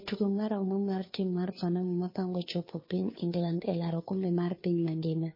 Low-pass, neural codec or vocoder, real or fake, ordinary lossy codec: 5.4 kHz; codec, 16 kHz, 2 kbps, FunCodec, trained on Chinese and English, 25 frames a second; fake; MP3, 24 kbps